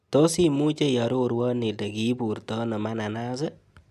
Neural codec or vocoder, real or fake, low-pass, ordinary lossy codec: none; real; 14.4 kHz; none